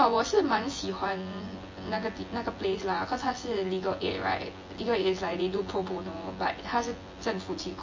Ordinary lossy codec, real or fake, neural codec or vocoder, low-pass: MP3, 32 kbps; fake; vocoder, 24 kHz, 100 mel bands, Vocos; 7.2 kHz